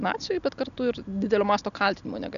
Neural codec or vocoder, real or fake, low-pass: none; real; 7.2 kHz